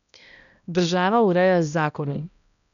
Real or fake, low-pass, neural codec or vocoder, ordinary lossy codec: fake; 7.2 kHz; codec, 16 kHz, 1 kbps, X-Codec, HuBERT features, trained on balanced general audio; none